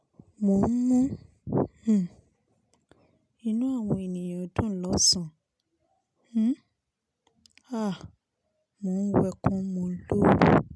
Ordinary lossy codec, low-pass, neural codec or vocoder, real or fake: none; none; none; real